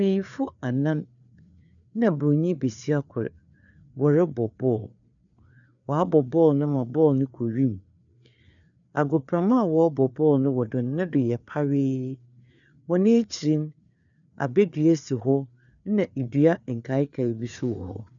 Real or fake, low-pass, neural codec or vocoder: fake; 7.2 kHz; codec, 16 kHz, 4 kbps, FreqCodec, larger model